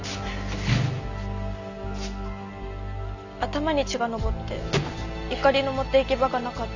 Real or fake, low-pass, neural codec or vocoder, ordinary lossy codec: real; 7.2 kHz; none; none